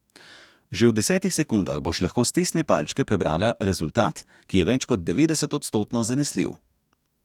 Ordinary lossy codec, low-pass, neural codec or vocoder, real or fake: none; 19.8 kHz; codec, 44.1 kHz, 2.6 kbps, DAC; fake